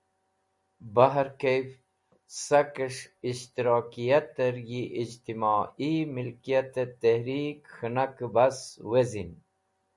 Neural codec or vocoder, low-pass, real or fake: none; 10.8 kHz; real